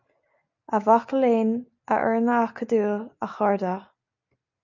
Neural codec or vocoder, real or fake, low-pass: none; real; 7.2 kHz